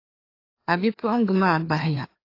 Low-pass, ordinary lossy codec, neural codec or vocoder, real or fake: 5.4 kHz; AAC, 32 kbps; codec, 16 kHz, 2 kbps, FreqCodec, larger model; fake